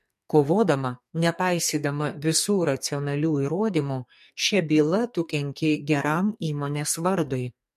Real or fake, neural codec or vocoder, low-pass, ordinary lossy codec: fake; codec, 44.1 kHz, 2.6 kbps, SNAC; 14.4 kHz; MP3, 64 kbps